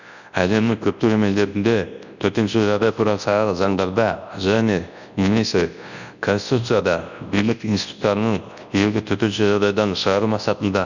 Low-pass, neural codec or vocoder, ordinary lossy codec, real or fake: 7.2 kHz; codec, 24 kHz, 0.9 kbps, WavTokenizer, large speech release; none; fake